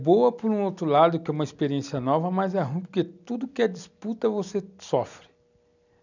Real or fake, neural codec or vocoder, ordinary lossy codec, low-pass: real; none; none; 7.2 kHz